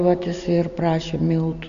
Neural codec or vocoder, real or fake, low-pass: none; real; 7.2 kHz